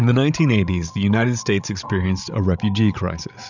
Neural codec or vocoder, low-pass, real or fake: codec, 16 kHz, 16 kbps, FreqCodec, larger model; 7.2 kHz; fake